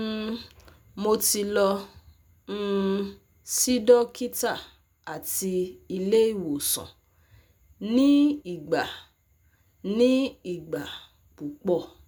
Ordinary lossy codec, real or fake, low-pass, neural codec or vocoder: none; real; none; none